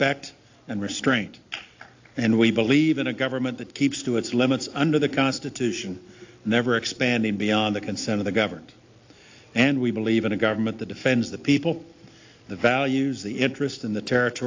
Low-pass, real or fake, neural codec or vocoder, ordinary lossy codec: 7.2 kHz; real; none; AAC, 48 kbps